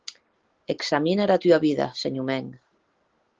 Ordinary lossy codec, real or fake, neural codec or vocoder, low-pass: Opus, 16 kbps; real; none; 7.2 kHz